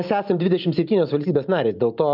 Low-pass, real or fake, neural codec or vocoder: 5.4 kHz; real; none